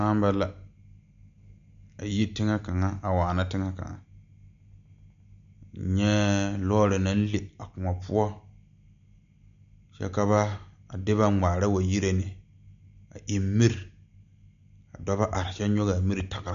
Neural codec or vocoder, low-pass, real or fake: none; 7.2 kHz; real